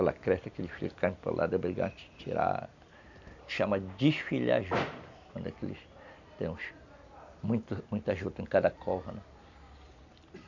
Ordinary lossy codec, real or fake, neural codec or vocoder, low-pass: none; real; none; 7.2 kHz